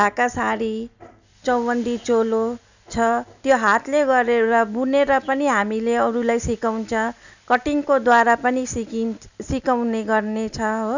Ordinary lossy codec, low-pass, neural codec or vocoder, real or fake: none; 7.2 kHz; none; real